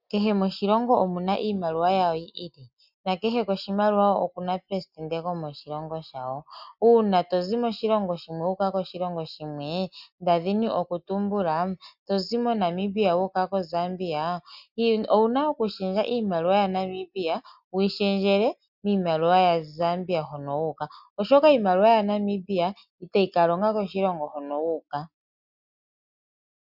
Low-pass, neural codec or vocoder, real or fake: 5.4 kHz; none; real